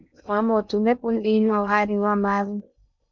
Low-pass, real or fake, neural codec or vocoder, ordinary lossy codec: 7.2 kHz; fake; codec, 16 kHz in and 24 kHz out, 0.6 kbps, FocalCodec, streaming, 2048 codes; MP3, 64 kbps